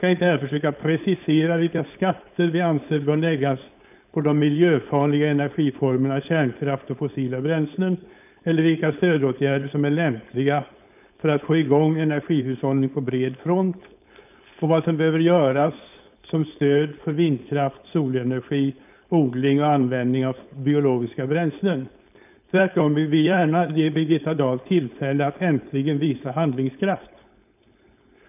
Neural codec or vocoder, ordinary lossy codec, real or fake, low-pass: codec, 16 kHz, 4.8 kbps, FACodec; none; fake; 3.6 kHz